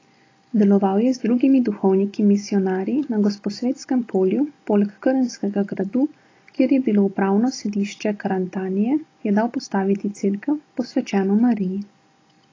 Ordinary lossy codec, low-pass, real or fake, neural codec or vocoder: AAC, 32 kbps; 7.2 kHz; real; none